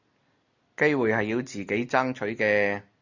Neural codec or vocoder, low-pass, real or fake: none; 7.2 kHz; real